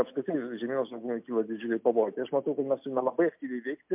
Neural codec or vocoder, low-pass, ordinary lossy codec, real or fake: none; 3.6 kHz; MP3, 32 kbps; real